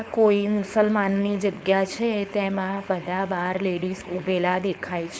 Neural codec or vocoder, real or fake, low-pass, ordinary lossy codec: codec, 16 kHz, 4.8 kbps, FACodec; fake; none; none